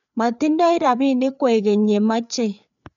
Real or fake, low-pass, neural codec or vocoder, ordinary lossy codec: fake; 7.2 kHz; codec, 16 kHz, 4 kbps, FreqCodec, larger model; none